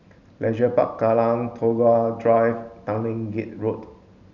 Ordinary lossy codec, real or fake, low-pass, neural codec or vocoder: none; real; 7.2 kHz; none